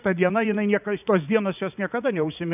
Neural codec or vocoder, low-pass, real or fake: vocoder, 44.1 kHz, 80 mel bands, Vocos; 3.6 kHz; fake